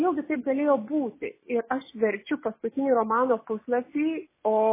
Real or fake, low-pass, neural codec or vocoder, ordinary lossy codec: real; 3.6 kHz; none; MP3, 16 kbps